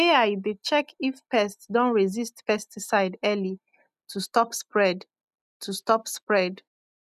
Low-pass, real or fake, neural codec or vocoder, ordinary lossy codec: 14.4 kHz; real; none; none